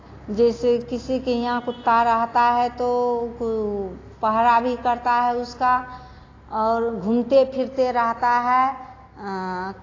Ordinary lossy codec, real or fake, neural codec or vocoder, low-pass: AAC, 32 kbps; real; none; 7.2 kHz